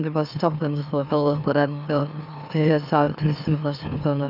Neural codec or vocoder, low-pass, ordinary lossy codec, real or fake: autoencoder, 44.1 kHz, a latent of 192 numbers a frame, MeloTTS; 5.4 kHz; none; fake